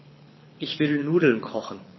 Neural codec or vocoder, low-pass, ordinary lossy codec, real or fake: codec, 24 kHz, 6 kbps, HILCodec; 7.2 kHz; MP3, 24 kbps; fake